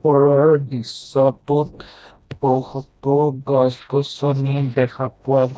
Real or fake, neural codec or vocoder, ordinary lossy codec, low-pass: fake; codec, 16 kHz, 1 kbps, FreqCodec, smaller model; none; none